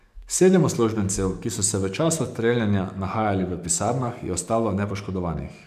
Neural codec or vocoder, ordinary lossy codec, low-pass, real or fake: codec, 44.1 kHz, 7.8 kbps, DAC; MP3, 96 kbps; 14.4 kHz; fake